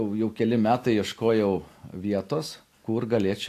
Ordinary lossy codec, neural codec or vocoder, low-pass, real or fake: AAC, 64 kbps; none; 14.4 kHz; real